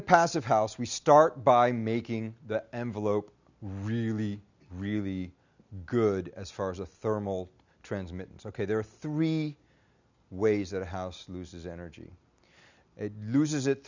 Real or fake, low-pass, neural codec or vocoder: real; 7.2 kHz; none